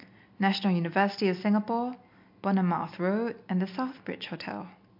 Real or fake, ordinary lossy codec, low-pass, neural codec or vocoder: real; MP3, 48 kbps; 5.4 kHz; none